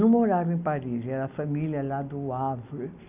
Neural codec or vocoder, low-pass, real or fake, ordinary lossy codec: none; 3.6 kHz; real; none